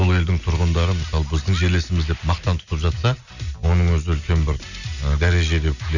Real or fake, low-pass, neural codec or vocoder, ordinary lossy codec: real; 7.2 kHz; none; none